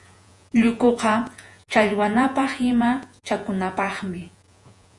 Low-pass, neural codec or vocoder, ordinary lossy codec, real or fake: 10.8 kHz; vocoder, 48 kHz, 128 mel bands, Vocos; Opus, 64 kbps; fake